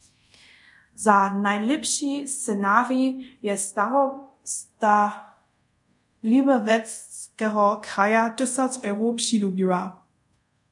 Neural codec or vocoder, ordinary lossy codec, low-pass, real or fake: codec, 24 kHz, 0.5 kbps, DualCodec; MP3, 64 kbps; 10.8 kHz; fake